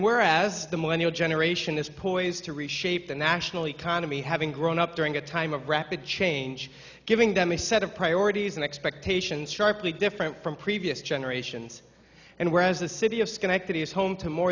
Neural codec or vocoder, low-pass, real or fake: none; 7.2 kHz; real